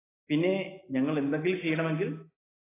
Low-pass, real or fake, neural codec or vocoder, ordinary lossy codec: 3.6 kHz; real; none; AAC, 16 kbps